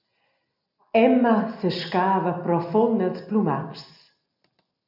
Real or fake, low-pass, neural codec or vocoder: real; 5.4 kHz; none